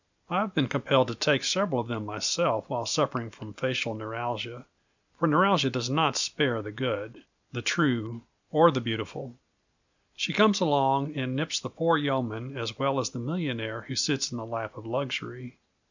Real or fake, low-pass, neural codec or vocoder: real; 7.2 kHz; none